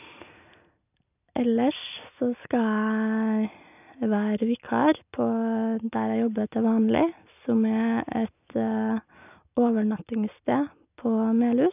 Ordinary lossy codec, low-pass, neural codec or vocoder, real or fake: none; 3.6 kHz; none; real